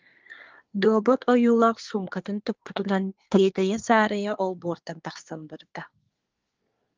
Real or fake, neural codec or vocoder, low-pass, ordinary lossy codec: fake; codec, 24 kHz, 1 kbps, SNAC; 7.2 kHz; Opus, 32 kbps